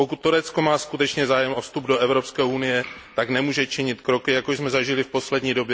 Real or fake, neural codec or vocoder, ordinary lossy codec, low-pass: real; none; none; none